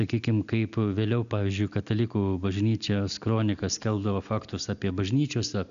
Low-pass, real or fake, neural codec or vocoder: 7.2 kHz; real; none